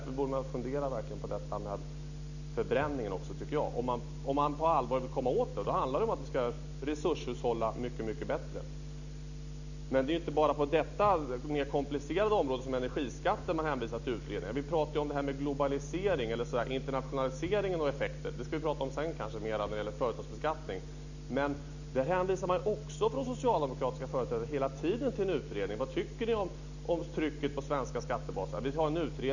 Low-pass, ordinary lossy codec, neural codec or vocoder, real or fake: 7.2 kHz; none; none; real